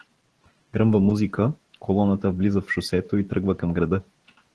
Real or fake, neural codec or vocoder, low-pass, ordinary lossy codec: fake; vocoder, 44.1 kHz, 128 mel bands every 512 samples, BigVGAN v2; 10.8 kHz; Opus, 16 kbps